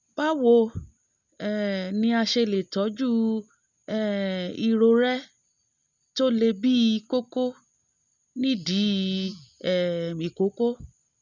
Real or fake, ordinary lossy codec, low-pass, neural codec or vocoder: real; none; 7.2 kHz; none